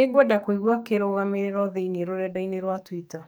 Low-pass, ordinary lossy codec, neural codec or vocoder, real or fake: none; none; codec, 44.1 kHz, 2.6 kbps, SNAC; fake